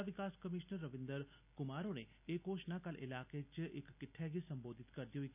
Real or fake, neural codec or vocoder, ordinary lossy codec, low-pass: real; none; none; 3.6 kHz